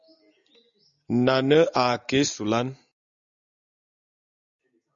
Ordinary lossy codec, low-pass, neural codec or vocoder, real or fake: MP3, 96 kbps; 7.2 kHz; none; real